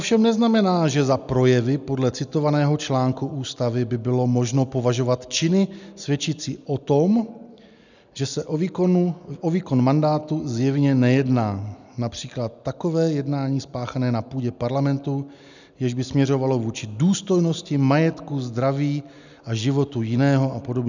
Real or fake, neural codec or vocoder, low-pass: real; none; 7.2 kHz